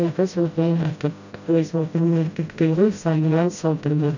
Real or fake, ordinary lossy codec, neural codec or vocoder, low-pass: fake; none; codec, 16 kHz, 0.5 kbps, FreqCodec, smaller model; 7.2 kHz